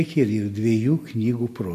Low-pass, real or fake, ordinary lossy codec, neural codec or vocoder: 14.4 kHz; real; MP3, 64 kbps; none